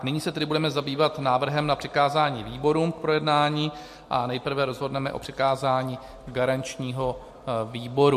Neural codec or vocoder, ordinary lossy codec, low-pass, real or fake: none; MP3, 64 kbps; 14.4 kHz; real